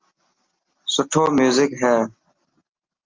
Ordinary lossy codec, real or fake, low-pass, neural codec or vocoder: Opus, 24 kbps; real; 7.2 kHz; none